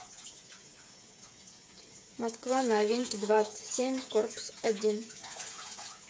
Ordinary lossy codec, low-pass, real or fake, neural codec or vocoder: none; none; fake; codec, 16 kHz, 8 kbps, FreqCodec, smaller model